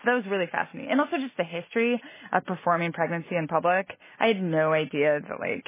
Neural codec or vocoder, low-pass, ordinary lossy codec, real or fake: none; 3.6 kHz; MP3, 16 kbps; real